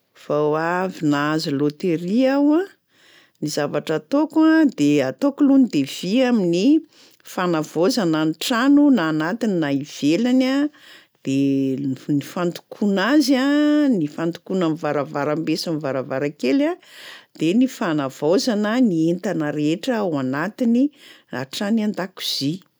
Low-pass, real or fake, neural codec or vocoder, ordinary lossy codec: none; real; none; none